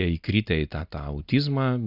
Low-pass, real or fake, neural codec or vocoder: 5.4 kHz; real; none